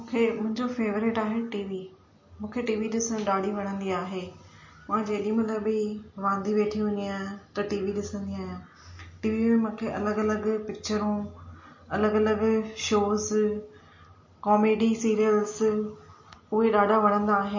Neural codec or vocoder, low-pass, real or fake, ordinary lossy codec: none; 7.2 kHz; real; MP3, 32 kbps